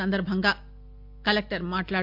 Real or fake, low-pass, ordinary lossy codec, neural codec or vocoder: real; 5.4 kHz; MP3, 48 kbps; none